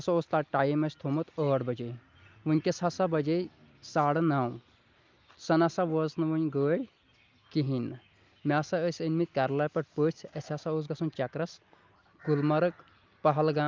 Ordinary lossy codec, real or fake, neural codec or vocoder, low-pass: Opus, 24 kbps; real; none; 7.2 kHz